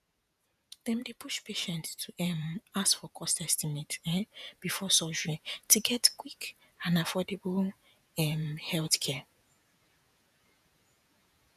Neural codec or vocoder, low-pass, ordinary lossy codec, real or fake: vocoder, 48 kHz, 128 mel bands, Vocos; 14.4 kHz; none; fake